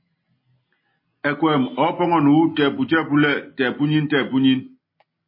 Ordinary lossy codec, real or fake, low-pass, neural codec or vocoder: MP3, 24 kbps; real; 5.4 kHz; none